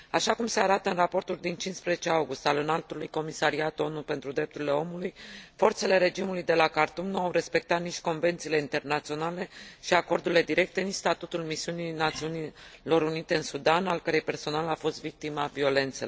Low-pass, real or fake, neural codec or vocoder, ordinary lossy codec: none; real; none; none